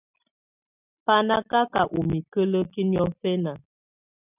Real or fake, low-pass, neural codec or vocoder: real; 3.6 kHz; none